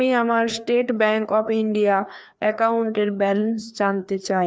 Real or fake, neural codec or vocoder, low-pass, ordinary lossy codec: fake; codec, 16 kHz, 2 kbps, FreqCodec, larger model; none; none